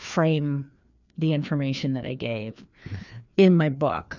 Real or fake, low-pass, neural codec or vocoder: fake; 7.2 kHz; codec, 16 kHz, 2 kbps, FreqCodec, larger model